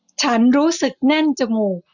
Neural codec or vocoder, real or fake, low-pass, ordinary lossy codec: none; real; 7.2 kHz; none